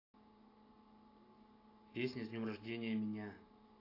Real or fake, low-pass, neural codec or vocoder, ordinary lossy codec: real; 5.4 kHz; none; AAC, 24 kbps